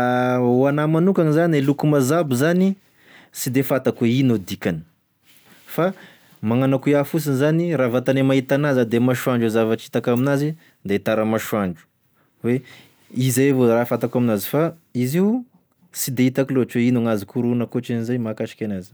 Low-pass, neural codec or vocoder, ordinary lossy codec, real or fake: none; none; none; real